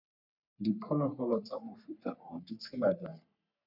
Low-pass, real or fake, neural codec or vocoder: 5.4 kHz; fake; codec, 44.1 kHz, 3.4 kbps, Pupu-Codec